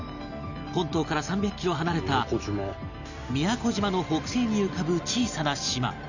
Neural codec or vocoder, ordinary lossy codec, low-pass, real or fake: none; none; 7.2 kHz; real